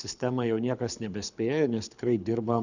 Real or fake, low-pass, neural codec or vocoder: fake; 7.2 kHz; codec, 24 kHz, 6 kbps, HILCodec